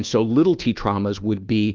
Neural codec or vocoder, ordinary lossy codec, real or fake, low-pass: codec, 24 kHz, 1.2 kbps, DualCodec; Opus, 24 kbps; fake; 7.2 kHz